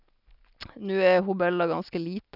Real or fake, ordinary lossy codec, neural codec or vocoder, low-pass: real; none; none; 5.4 kHz